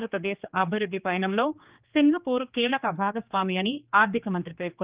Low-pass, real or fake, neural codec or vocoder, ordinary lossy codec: 3.6 kHz; fake; codec, 16 kHz, 2 kbps, X-Codec, HuBERT features, trained on general audio; Opus, 24 kbps